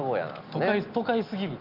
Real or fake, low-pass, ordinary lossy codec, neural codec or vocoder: real; 5.4 kHz; Opus, 24 kbps; none